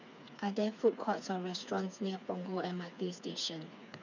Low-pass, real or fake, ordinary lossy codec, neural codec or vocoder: 7.2 kHz; fake; none; codec, 16 kHz, 4 kbps, FreqCodec, smaller model